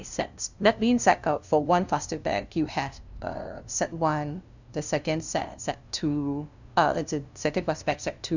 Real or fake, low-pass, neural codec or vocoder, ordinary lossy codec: fake; 7.2 kHz; codec, 16 kHz, 0.5 kbps, FunCodec, trained on LibriTTS, 25 frames a second; none